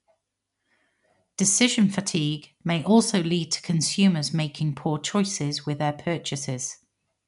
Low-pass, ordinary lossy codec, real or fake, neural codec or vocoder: 10.8 kHz; none; real; none